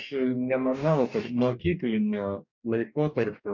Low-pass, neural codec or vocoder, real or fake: 7.2 kHz; codec, 44.1 kHz, 2.6 kbps, DAC; fake